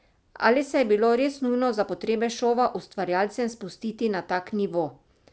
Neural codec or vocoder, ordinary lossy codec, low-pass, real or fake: none; none; none; real